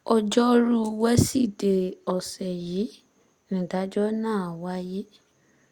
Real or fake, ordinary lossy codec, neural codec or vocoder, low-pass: real; Opus, 64 kbps; none; 19.8 kHz